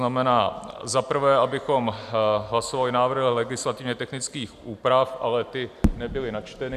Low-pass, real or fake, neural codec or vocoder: 14.4 kHz; real; none